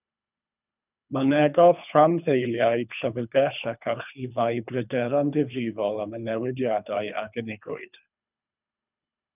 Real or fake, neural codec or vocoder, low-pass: fake; codec, 24 kHz, 3 kbps, HILCodec; 3.6 kHz